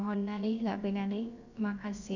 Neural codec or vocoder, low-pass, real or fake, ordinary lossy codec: codec, 16 kHz, about 1 kbps, DyCAST, with the encoder's durations; 7.2 kHz; fake; none